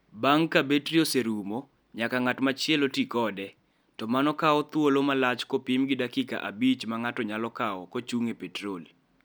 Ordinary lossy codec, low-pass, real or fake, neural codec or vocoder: none; none; real; none